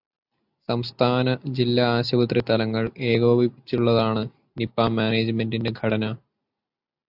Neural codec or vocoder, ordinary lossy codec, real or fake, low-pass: none; AAC, 48 kbps; real; 5.4 kHz